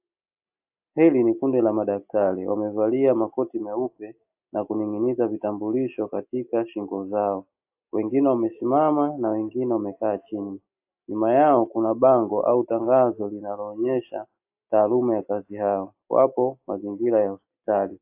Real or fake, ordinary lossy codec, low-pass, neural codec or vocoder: real; AAC, 32 kbps; 3.6 kHz; none